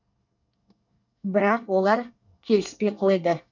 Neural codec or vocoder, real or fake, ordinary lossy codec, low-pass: codec, 24 kHz, 1 kbps, SNAC; fake; none; 7.2 kHz